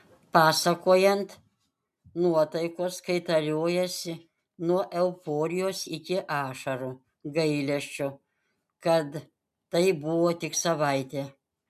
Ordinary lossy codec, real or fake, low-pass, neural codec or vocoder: MP3, 96 kbps; real; 14.4 kHz; none